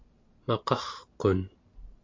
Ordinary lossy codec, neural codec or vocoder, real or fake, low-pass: MP3, 48 kbps; none; real; 7.2 kHz